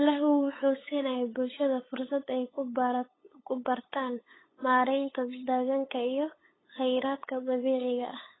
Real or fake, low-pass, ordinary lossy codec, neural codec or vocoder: fake; 7.2 kHz; AAC, 16 kbps; codec, 16 kHz, 8 kbps, FunCodec, trained on LibriTTS, 25 frames a second